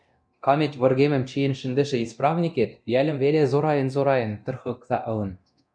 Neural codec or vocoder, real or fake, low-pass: codec, 24 kHz, 0.9 kbps, DualCodec; fake; 9.9 kHz